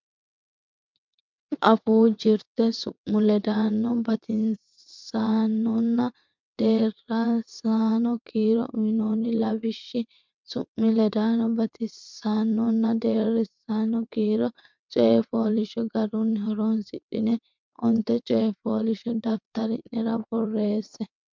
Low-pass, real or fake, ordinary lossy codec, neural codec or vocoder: 7.2 kHz; fake; MP3, 64 kbps; vocoder, 22.05 kHz, 80 mel bands, WaveNeXt